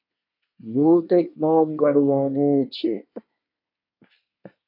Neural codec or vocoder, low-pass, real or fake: codec, 24 kHz, 1 kbps, SNAC; 5.4 kHz; fake